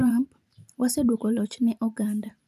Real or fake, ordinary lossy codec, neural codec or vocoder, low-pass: fake; none; vocoder, 44.1 kHz, 128 mel bands every 512 samples, BigVGAN v2; 14.4 kHz